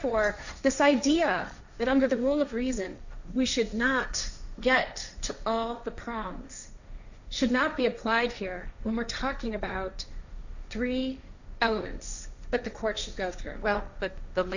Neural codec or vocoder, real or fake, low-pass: codec, 16 kHz, 1.1 kbps, Voila-Tokenizer; fake; 7.2 kHz